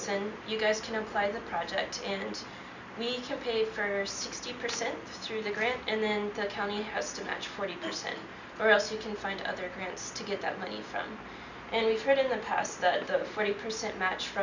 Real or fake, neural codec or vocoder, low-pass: real; none; 7.2 kHz